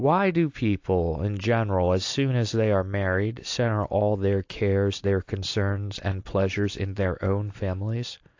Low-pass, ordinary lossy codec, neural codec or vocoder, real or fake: 7.2 kHz; AAC, 48 kbps; none; real